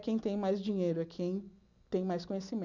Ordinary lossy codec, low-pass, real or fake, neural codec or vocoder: none; 7.2 kHz; real; none